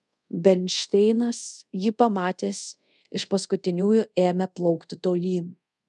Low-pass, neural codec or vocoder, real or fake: 10.8 kHz; codec, 24 kHz, 0.5 kbps, DualCodec; fake